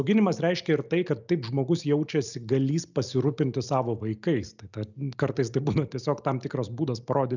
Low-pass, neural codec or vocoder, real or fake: 7.2 kHz; none; real